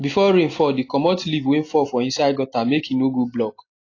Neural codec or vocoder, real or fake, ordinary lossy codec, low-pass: none; real; AAC, 32 kbps; 7.2 kHz